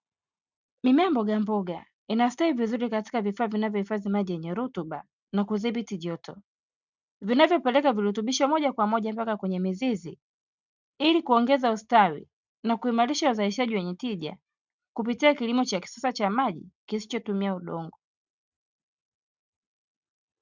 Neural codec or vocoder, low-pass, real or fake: none; 7.2 kHz; real